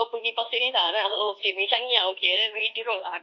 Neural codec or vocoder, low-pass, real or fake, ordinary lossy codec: codec, 24 kHz, 1.2 kbps, DualCodec; 7.2 kHz; fake; AAC, 48 kbps